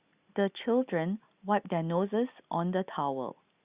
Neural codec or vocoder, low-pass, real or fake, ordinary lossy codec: none; 3.6 kHz; real; Opus, 64 kbps